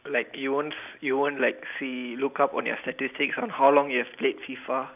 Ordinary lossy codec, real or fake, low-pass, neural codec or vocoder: none; real; 3.6 kHz; none